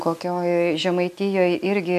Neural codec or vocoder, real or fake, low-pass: none; real; 14.4 kHz